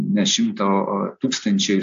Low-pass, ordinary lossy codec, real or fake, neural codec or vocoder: 7.2 kHz; AAC, 64 kbps; real; none